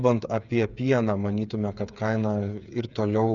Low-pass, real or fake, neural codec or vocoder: 7.2 kHz; fake; codec, 16 kHz, 8 kbps, FreqCodec, smaller model